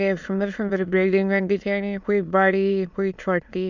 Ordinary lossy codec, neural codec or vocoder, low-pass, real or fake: none; autoencoder, 22.05 kHz, a latent of 192 numbers a frame, VITS, trained on many speakers; 7.2 kHz; fake